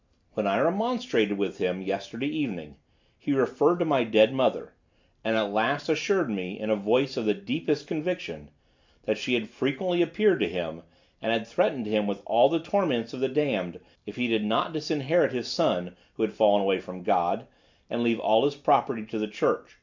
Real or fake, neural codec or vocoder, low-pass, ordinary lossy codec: real; none; 7.2 kHz; MP3, 64 kbps